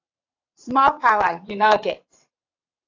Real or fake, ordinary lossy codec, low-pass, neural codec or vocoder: fake; AAC, 48 kbps; 7.2 kHz; vocoder, 22.05 kHz, 80 mel bands, WaveNeXt